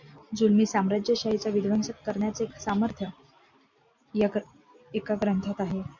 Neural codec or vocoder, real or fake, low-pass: none; real; 7.2 kHz